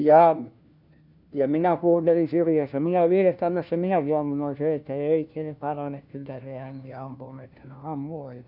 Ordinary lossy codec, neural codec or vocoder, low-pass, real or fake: none; codec, 16 kHz, 1 kbps, FunCodec, trained on LibriTTS, 50 frames a second; 5.4 kHz; fake